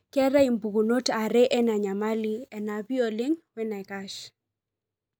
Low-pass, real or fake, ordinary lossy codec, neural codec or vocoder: none; real; none; none